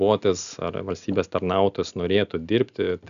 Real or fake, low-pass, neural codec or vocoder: real; 7.2 kHz; none